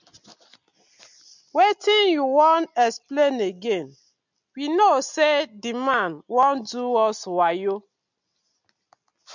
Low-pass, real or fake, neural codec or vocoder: 7.2 kHz; real; none